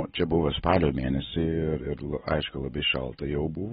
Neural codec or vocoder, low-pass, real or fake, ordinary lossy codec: none; 19.8 kHz; real; AAC, 16 kbps